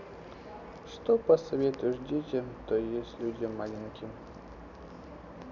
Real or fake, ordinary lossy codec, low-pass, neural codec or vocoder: real; none; 7.2 kHz; none